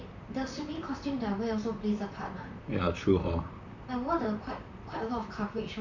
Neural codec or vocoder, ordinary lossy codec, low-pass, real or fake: vocoder, 44.1 kHz, 128 mel bands, Pupu-Vocoder; none; 7.2 kHz; fake